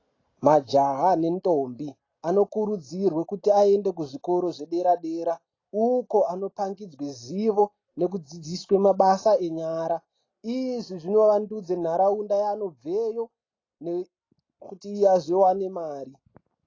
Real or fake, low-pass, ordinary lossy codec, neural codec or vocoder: real; 7.2 kHz; AAC, 32 kbps; none